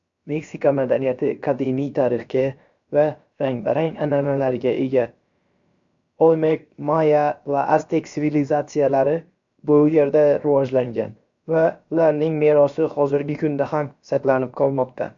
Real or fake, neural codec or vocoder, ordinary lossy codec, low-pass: fake; codec, 16 kHz, about 1 kbps, DyCAST, with the encoder's durations; MP3, 64 kbps; 7.2 kHz